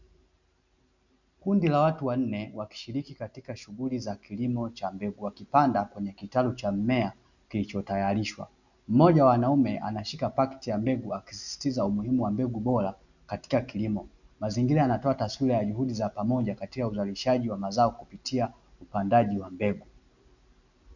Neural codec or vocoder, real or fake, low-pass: none; real; 7.2 kHz